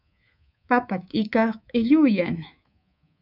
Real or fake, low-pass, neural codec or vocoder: fake; 5.4 kHz; codec, 24 kHz, 3.1 kbps, DualCodec